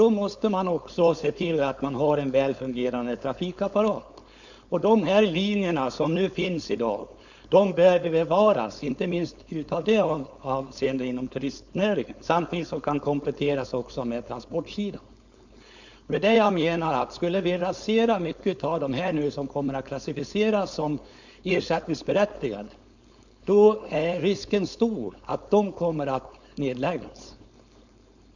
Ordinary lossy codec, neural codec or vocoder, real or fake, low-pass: none; codec, 16 kHz, 4.8 kbps, FACodec; fake; 7.2 kHz